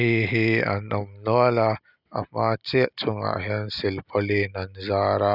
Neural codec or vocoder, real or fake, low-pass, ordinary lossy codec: none; real; 5.4 kHz; none